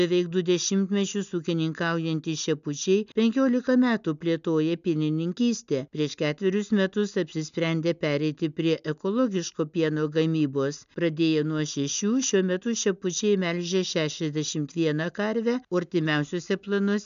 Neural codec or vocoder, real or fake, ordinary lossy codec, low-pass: none; real; MP3, 96 kbps; 7.2 kHz